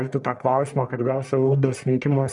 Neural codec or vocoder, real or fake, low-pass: codec, 44.1 kHz, 1.7 kbps, Pupu-Codec; fake; 10.8 kHz